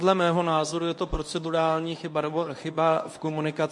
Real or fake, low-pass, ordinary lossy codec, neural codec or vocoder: fake; 10.8 kHz; MP3, 48 kbps; codec, 24 kHz, 0.9 kbps, WavTokenizer, medium speech release version 1